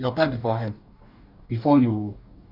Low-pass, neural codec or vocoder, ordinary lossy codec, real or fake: 5.4 kHz; codec, 44.1 kHz, 2.6 kbps, DAC; none; fake